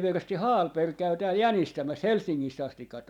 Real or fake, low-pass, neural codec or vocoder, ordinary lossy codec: real; 19.8 kHz; none; none